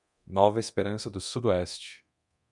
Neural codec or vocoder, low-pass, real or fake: codec, 24 kHz, 0.9 kbps, DualCodec; 10.8 kHz; fake